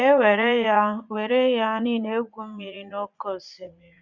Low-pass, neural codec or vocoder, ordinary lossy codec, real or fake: 7.2 kHz; vocoder, 44.1 kHz, 80 mel bands, Vocos; Opus, 64 kbps; fake